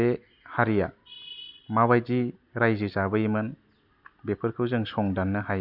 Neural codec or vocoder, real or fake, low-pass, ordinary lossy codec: none; real; 5.4 kHz; none